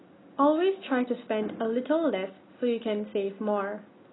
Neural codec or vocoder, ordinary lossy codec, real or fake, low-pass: none; AAC, 16 kbps; real; 7.2 kHz